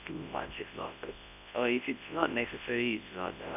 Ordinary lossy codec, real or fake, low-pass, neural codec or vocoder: none; fake; 3.6 kHz; codec, 24 kHz, 0.9 kbps, WavTokenizer, large speech release